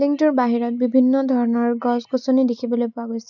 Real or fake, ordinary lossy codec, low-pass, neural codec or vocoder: real; none; 7.2 kHz; none